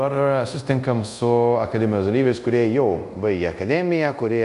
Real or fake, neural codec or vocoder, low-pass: fake; codec, 24 kHz, 0.5 kbps, DualCodec; 10.8 kHz